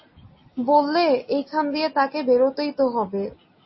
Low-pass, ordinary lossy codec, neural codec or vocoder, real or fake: 7.2 kHz; MP3, 24 kbps; none; real